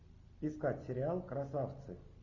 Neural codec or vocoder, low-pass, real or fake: none; 7.2 kHz; real